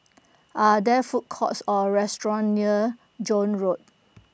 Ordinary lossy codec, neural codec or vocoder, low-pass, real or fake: none; none; none; real